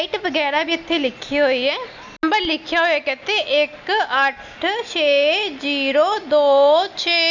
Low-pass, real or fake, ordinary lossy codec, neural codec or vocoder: 7.2 kHz; real; none; none